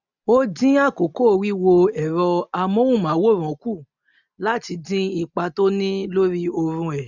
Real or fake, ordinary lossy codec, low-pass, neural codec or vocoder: real; none; 7.2 kHz; none